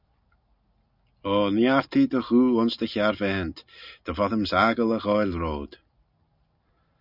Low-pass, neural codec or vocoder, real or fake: 5.4 kHz; none; real